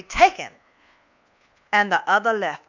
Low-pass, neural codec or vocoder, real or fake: 7.2 kHz; codec, 24 kHz, 1.2 kbps, DualCodec; fake